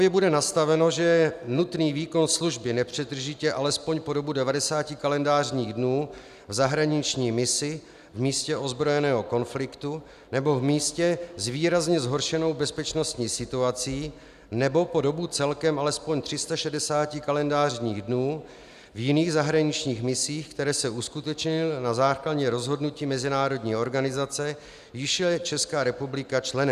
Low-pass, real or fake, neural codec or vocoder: 14.4 kHz; real; none